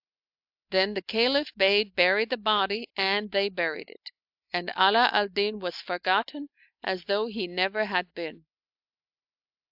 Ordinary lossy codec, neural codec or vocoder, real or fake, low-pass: AAC, 48 kbps; none; real; 5.4 kHz